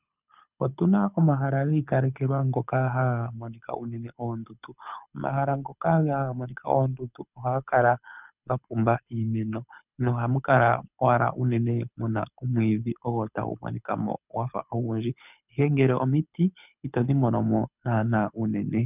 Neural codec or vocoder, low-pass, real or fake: codec, 24 kHz, 6 kbps, HILCodec; 3.6 kHz; fake